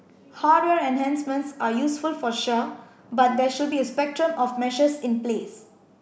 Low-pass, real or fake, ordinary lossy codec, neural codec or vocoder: none; real; none; none